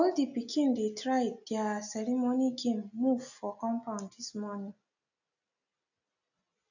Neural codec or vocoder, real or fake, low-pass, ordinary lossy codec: none; real; 7.2 kHz; none